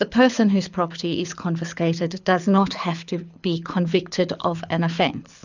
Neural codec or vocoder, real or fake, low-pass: codec, 24 kHz, 6 kbps, HILCodec; fake; 7.2 kHz